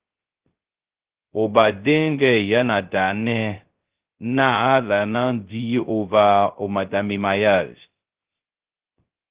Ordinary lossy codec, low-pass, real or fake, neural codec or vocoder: Opus, 16 kbps; 3.6 kHz; fake; codec, 16 kHz, 0.2 kbps, FocalCodec